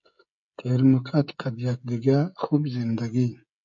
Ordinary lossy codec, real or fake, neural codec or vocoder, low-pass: MP3, 48 kbps; fake; codec, 16 kHz, 16 kbps, FreqCodec, smaller model; 7.2 kHz